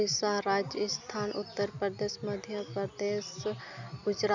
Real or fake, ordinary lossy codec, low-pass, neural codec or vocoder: real; none; 7.2 kHz; none